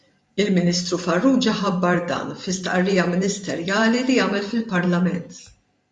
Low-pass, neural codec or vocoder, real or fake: 10.8 kHz; vocoder, 44.1 kHz, 128 mel bands every 512 samples, BigVGAN v2; fake